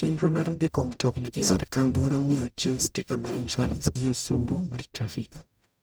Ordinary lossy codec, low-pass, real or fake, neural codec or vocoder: none; none; fake; codec, 44.1 kHz, 0.9 kbps, DAC